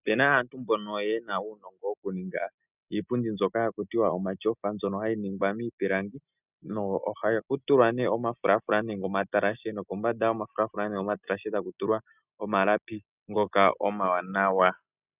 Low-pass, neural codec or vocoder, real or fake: 3.6 kHz; none; real